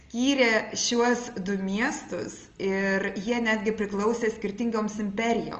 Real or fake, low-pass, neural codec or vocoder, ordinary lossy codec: real; 7.2 kHz; none; Opus, 24 kbps